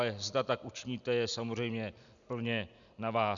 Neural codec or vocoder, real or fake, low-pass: none; real; 7.2 kHz